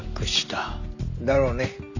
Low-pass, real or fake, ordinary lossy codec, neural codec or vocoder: 7.2 kHz; real; none; none